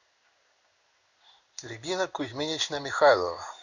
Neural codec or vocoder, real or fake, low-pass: codec, 16 kHz in and 24 kHz out, 1 kbps, XY-Tokenizer; fake; 7.2 kHz